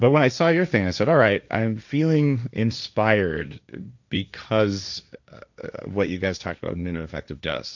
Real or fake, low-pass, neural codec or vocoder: fake; 7.2 kHz; codec, 16 kHz, 1.1 kbps, Voila-Tokenizer